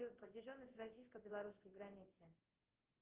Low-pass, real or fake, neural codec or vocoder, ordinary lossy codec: 3.6 kHz; fake; codec, 16 kHz in and 24 kHz out, 1 kbps, XY-Tokenizer; Opus, 16 kbps